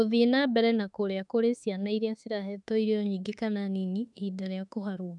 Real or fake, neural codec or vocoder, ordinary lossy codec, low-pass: fake; autoencoder, 48 kHz, 32 numbers a frame, DAC-VAE, trained on Japanese speech; none; 10.8 kHz